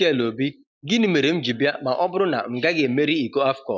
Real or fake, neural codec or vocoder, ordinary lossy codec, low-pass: real; none; none; none